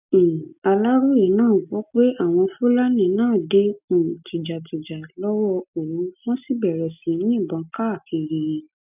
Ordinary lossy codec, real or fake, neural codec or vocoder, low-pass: none; real; none; 3.6 kHz